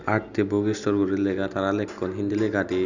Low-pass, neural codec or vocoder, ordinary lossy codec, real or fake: 7.2 kHz; none; none; real